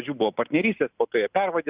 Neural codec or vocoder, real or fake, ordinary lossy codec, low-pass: none; real; Opus, 64 kbps; 3.6 kHz